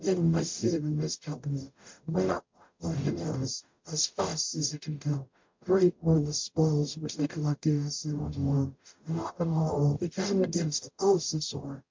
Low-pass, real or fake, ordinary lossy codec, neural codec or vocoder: 7.2 kHz; fake; MP3, 48 kbps; codec, 44.1 kHz, 0.9 kbps, DAC